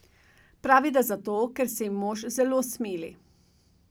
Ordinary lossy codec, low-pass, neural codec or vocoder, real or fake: none; none; none; real